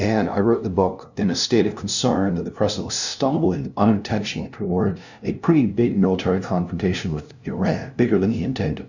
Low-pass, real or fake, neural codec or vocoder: 7.2 kHz; fake; codec, 16 kHz, 0.5 kbps, FunCodec, trained on LibriTTS, 25 frames a second